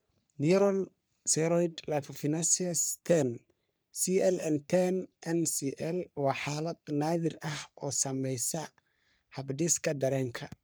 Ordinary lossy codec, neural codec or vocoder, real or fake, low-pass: none; codec, 44.1 kHz, 3.4 kbps, Pupu-Codec; fake; none